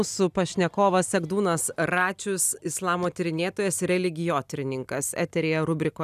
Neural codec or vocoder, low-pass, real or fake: none; 14.4 kHz; real